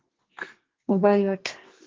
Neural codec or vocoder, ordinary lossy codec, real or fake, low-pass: codec, 32 kHz, 1.9 kbps, SNAC; Opus, 16 kbps; fake; 7.2 kHz